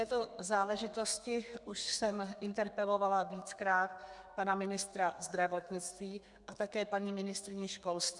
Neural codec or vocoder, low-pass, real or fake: codec, 32 kHz, 1.9 kbps, SNAC; 10.8 kHz; fake